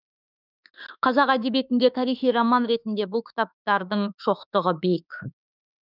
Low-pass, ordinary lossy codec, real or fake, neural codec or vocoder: 5.4 kHz; none; fake; autoencoder, 48 kHz, 32 numbers a frame, DAC-VAE, trained on Japanese speech